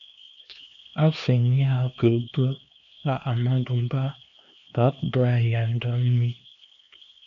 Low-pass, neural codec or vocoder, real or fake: 7.2 kHz; codec, 16 kHz, 2 kbps, X-Codec, HuBERT features, trained on LibriSpeech; fake